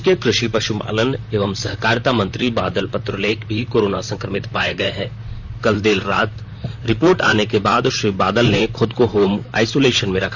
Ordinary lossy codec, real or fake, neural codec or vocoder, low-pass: none; fake; vocoder, 44.1 kHz, 128 mel bands, Pupu-Vocoder; 7.2 kHz